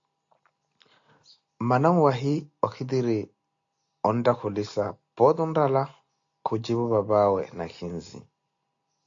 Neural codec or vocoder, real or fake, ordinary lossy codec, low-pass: none; real; AAC, 48 kbps; 7.2 kHz